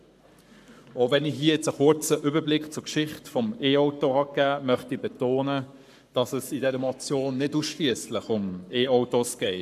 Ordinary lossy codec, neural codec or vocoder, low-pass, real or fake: none; codec, 44.1 kHz, 7.8 kbps, Pupu-Codec; 14.4 kHz; fake